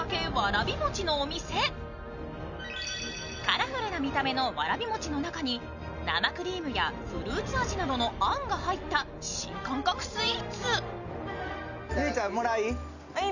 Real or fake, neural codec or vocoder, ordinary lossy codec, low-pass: real; none; none; 7.2 kHz